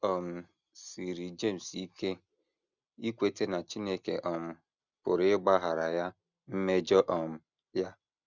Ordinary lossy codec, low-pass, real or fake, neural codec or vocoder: none; 7.2 kHz; real; none